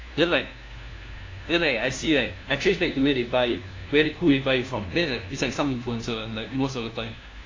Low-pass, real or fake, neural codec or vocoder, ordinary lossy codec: 7.2 kHz; fake; codec, 16 kHz, 1 kbps, FunCodec, trained on LibriTTS, 50 frames a second; AAC, 32 kbps